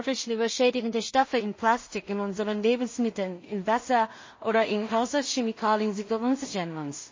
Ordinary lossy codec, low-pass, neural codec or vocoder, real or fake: MP3, 32 kbps; 7.2 kHz; codec, 16 kHz in and 24 kHz out, 0.4 kbps, LongCat-Audio-Codec, two codebook decoder; fake